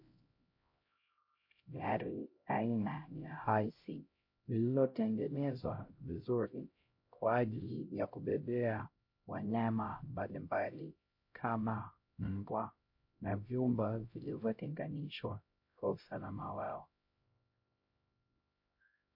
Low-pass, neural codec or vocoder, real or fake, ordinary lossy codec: 5.4 kHz; codec, 16 kHz, 0.5 kbps, X-Codec, HuBERT features, trained on LibriSpeech; fake; MP3, 32 kbps